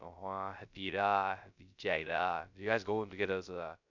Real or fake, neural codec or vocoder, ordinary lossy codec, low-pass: fake; codec, 16 kHz, 0.3 kbps, FocalCodec; none; 7.2 kHz